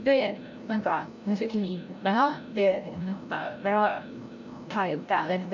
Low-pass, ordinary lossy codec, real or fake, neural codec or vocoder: 7.2 kHz; none; fake; codec, 16 kHz, 0.5 kbps, FreqCodec, larger model